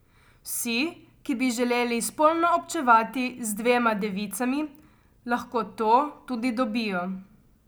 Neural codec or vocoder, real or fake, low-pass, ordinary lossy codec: none; real; none; none